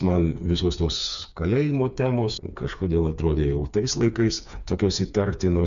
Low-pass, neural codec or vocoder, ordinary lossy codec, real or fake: 7.2 kHz; codec, 16 kHz, 4 kbps, FreqCodec, smaller model; MP3, 96 kbps; fake